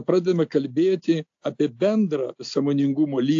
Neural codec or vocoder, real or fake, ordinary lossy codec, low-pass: none; real; AAC, 48 kbps; 7.2 kHz